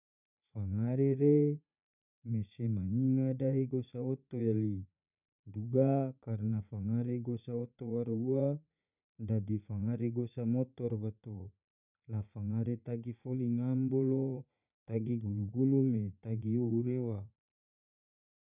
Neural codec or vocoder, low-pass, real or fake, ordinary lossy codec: vocoder, 44.1 kHz, 80 mel bands, Vocos; 3.6 kHz; fake; none